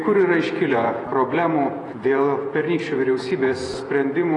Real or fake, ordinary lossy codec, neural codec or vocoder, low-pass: fake; AAC, 32 kbps; vocoder, 48 kHz, 128 mel bands, Vocos; 10.8 kHz